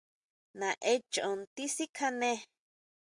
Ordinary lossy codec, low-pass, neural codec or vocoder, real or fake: Opus, 64 kbps; 10.8 kHz; none; real